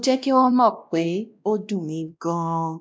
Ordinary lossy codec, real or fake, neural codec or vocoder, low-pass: none; fake; codec, 16 kHz, 1 kbps, X-Codec, WavLM features, trained on Multilingual LibriSpeech; none